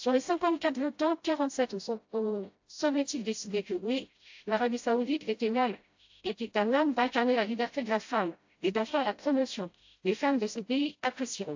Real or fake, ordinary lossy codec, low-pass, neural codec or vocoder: fake; AAC, 48 kbps; 7.2 kHz; codec, 16 kHz, 0.5 kbps, FreqCodec, smaller model